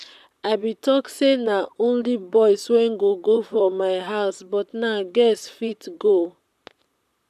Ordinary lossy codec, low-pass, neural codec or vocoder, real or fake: MP3, 96 kbps; 14.4 kHz; vocoder, 44.1 kHz, 128 mel bands, Pupu-Vocoder; fake